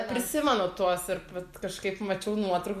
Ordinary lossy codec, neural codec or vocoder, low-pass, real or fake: MP3, 96 kbps; none; 14.4 kHz; real